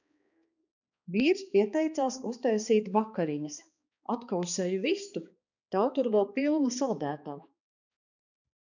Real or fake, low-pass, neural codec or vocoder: fake; 7.2 kHz; codec, 16 kHz, 2 kbps, X-Codec, HuBERT features, trained on balanced general audio